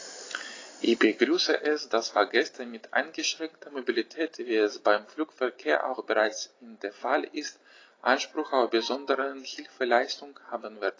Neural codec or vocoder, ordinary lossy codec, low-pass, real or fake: none; AAC, 32 kbps; 7.2 kHz; real